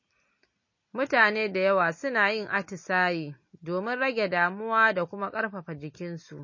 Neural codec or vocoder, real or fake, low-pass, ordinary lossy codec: none; real; 7.2 kHz; MP3, 32 kbps